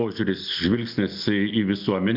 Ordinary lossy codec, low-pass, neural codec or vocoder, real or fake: MP3, 48 kbps; 5.4 kHz; none; real